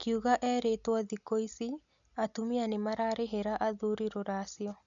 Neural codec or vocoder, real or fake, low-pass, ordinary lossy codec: none; real; 7.2 kHz; none